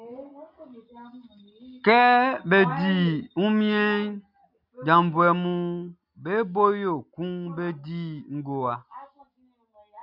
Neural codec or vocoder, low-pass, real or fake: none; 5.4 kHz; real